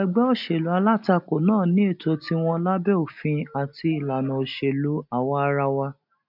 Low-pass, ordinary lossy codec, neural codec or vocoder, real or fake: 5.4 kHz; none; none; real